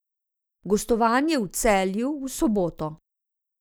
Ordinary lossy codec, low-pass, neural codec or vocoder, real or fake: none; none; none; real